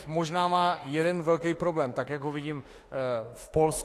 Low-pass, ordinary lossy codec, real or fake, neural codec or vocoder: 14.4 kHz; AAC, 48 kbps; fake; autoencoder, 48 kHz, 32 numbers a frame, DAC-VAE, trained on Japanese speech